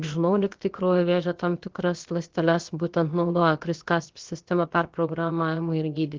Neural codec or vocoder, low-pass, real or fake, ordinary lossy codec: codec, 16 kHz, about 1 kbps, DyCAST, with the encoder's durations; 7.2 kHz; fake; Opus, 16 kbps